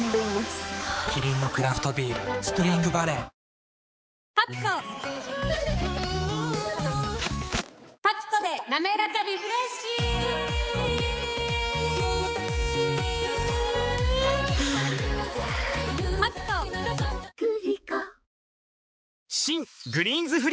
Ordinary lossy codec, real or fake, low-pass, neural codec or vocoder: none; fake; none; codec, 16 kHz, 4 kbps, X-Codec, HuBERT features, trained on balanced general audio